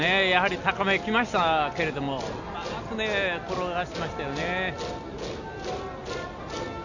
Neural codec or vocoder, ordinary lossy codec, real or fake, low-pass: none; none; real; 7.2 kHz